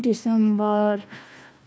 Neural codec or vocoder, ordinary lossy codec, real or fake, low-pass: codec, 16 kHz, 1 kbps, FunCodec, trained on Chinese and English, 50 frames a second; none; fake; none